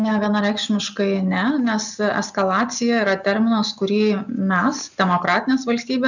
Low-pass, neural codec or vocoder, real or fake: 7.2 kHz; none; real